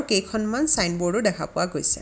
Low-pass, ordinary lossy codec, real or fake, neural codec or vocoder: none; none; real; none